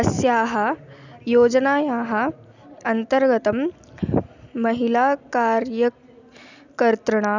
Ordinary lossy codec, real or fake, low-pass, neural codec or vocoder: none; real; 7.2 kHz; none